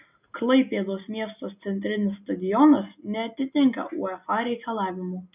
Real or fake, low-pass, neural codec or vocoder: real; 3.6 kHz; none